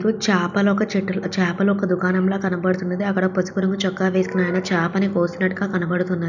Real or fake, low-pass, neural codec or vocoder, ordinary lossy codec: real; 7.2 kHz; none; none